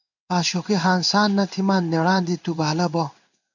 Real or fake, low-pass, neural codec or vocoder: fake; 7.2 kHz; codec, 16 kHz in and 24 kHz out, 1 kbps, XY-Tokenizer